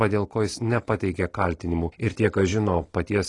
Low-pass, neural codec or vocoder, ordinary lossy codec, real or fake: 10.8 kHz; none; AAC, 32 kbps; real